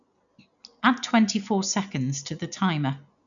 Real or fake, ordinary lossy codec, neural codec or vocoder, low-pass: real; none; none; 7.2 kHz